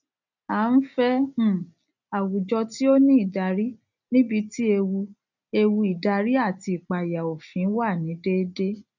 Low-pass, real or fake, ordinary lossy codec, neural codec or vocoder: 7.2 kHz; real; none; none